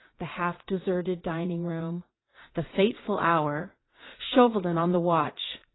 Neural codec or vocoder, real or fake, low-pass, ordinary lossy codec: vocoder, 44.1 kHz, 80 mel bands, Vocos; fake; 7.2 kHz; AAC, 16 kbps